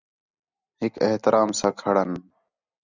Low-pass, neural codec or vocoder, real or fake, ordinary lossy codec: 7.2 kHz; none; real; Opus, 64 kbps